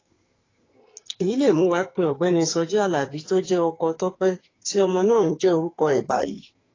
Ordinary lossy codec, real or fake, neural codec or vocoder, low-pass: AAC, 32 kbps; fake; codec, 44.1 kHz, 2.6 kbps, SNAC; 7.2 kHz